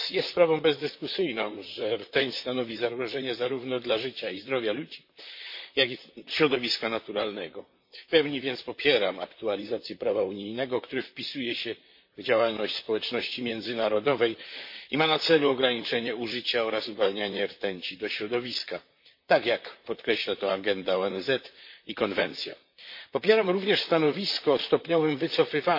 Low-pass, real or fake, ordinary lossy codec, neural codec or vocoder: 5.4 kHz; fake; MP3, 32 kbps; vocoder, 44.1 kHz, 128 mel bands, Pupu-Vocoder